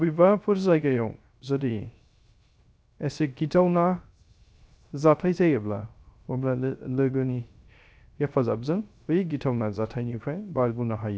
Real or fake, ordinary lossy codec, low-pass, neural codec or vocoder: fake; none; none; codec, 16 kHz, 0.3 kbps, FocalCodec